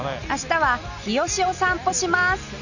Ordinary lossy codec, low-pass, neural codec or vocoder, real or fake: none; 7.2 kHz; none; real